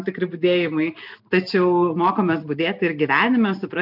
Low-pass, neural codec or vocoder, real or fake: 5.4 kHz; none; real